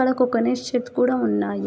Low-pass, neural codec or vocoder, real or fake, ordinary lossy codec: none; none; real; none